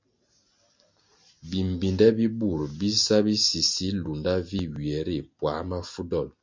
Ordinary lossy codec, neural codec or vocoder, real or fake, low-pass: MP3, 48 kbps; none; real; 7.2 kHz